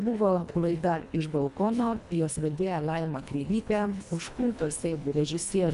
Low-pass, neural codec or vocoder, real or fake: 10.8 kHz; codec, 24 kHz, 1.5 kbps, HILCodec; fake